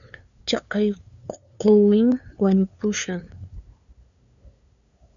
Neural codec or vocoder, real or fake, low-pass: codec, 16 kHz, 2 kbps, FunCodec, trained on LibriTTS, 25 frames a second; fake; 7.2 kHz